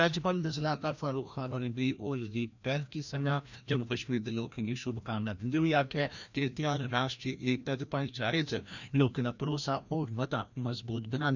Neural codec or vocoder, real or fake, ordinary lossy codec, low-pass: codec, 16 kHz, 1 kbps, FreqCodec, larger model; fake; none; 7.2 kHz